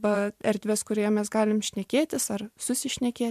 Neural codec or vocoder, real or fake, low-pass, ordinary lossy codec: vocoder, 44.1 kHz, 128 mel bands every 512 samples, BigVGAN v2; fake; 14.4 kHz; MP3, 96 kbps